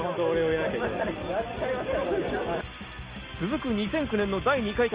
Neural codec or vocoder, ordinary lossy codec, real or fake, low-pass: none; Opus, 24 kbps; real; 3.6 kHz